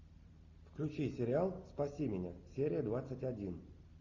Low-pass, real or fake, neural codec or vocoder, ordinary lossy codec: 7.2 kHz; real; none; AAC, 48 kbps